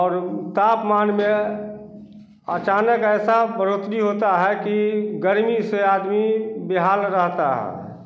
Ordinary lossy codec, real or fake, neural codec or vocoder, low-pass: none; real; none; none